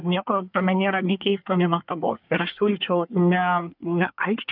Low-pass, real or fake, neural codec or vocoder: 5.4 kHz; fake; codec, 24 kHz, 1 kbps, SNAC